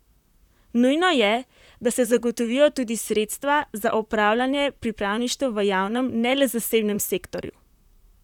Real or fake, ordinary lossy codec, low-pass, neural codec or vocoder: fake; none; 19.8 kHz; vocoder, 44.1 kHz, 128 mel bands, Pupu-Vocoder